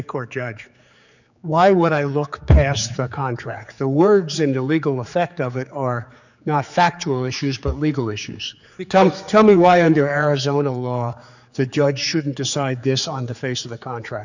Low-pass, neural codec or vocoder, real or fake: 7.2 kHz; codec, 16 kHz, 4 kbps, X-Codec, HuBERT features, trained on general audio; fake